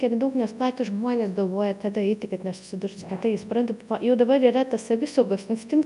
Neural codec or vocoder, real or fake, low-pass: codec, 24 kHz, 0.9 kbps, WavTokenizer, large speech release; fake; 10.8 kHz